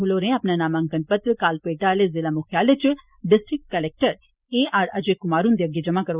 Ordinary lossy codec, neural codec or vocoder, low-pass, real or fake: Opus, 64 kbps; none; 3.6 kHz; real